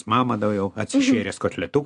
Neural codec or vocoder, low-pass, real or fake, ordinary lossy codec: none; 10.8 kHz; real; AAC, 64 kbps